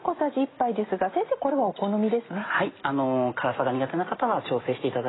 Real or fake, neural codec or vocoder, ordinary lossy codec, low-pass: real; none; AAC, 16 kbps; 7.2 kHz